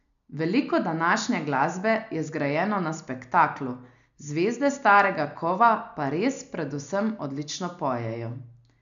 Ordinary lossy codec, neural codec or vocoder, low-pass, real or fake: none; none; 7.2 kHz; real